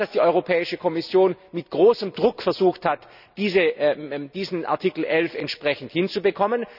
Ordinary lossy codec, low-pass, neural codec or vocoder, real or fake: none; 5.4 kHz; none; real